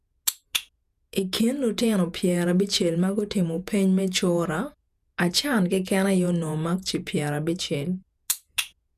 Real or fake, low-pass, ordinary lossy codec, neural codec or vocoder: fake; 14.4 kHz; none; vocoder, 48 kHz, 128 mel bands, Vocos